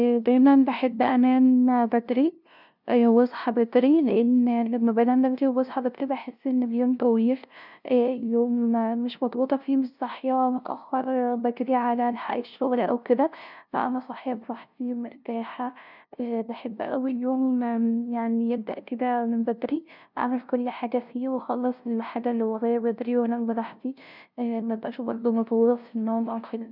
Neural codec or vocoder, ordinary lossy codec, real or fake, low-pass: codec, 16 kHz, 0.5 kbps, FunCodec, trained on LibriTTS, 25 frames a second; none; fake; 5.4 kHz